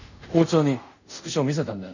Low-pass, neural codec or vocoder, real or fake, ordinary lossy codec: 7.2 kHz; codec, 24 kHz, 0.5 kbps, DualCodec; fake; none